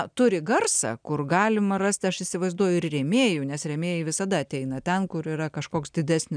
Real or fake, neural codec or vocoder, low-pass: real; none; 9.9 kHz